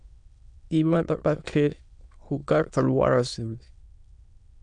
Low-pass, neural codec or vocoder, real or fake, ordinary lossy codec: 9.9 kHz; autoencoder, 22.05 kHz, a latent of 192 numbers a frame, VITS, trained on many speakers; fake; MP3, 96 kbps